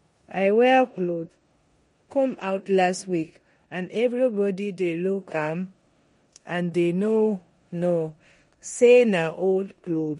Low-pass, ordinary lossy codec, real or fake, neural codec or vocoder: 10.8 kHz; MP3, 48 kbps; fake; codec, 16 kHz in and 24 kHz out, 0.9 kbps, LongCat-Audio-Codec, four codebook decoder